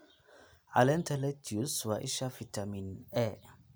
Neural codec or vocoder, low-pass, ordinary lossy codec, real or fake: none; none; none; real